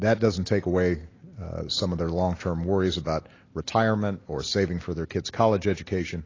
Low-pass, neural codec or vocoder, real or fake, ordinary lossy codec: 7.2 kHz; none; real; AAC, 32 kbps